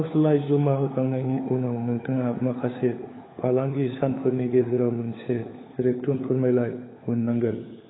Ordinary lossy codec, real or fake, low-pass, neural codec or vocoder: AAC, 16 kbps; fake; 7.2 kHz; codec, 16 kHz, 4 kbps, FunCodec, trained on LibriTTS, 50 frames a second